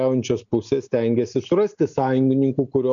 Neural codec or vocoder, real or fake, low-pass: none; real; 7.2 kHz